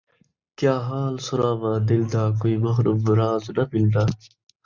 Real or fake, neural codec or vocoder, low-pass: real; none; 7.2 kHz